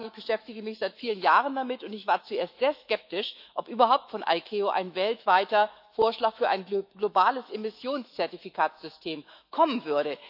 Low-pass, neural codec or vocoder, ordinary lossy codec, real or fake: 5.4 kHz; autoencoder, 48 kHz, 128 numbers a frame, DAC-VAE, trained on Japanese speech; none; fake